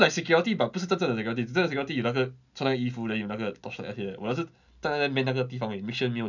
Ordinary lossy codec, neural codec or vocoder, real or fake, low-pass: none; none; real; 7.2 kHz